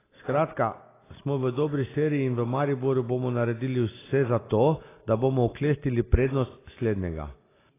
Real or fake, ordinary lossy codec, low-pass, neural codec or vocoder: real; AAC, 16 kbps; 3.6 kHz; none